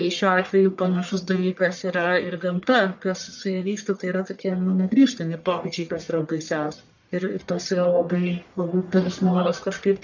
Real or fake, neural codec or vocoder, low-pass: fake; codec, 44.1 kHz, 1.7 kbps, Pupu-Codec; 7.2 kHz